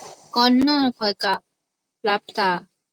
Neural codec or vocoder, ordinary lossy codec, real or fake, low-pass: vocoder, 44.1 kHz, 128 mel bands, Pupu-Vocoder; Opus, 32 kbps; fake; 19.8 kHz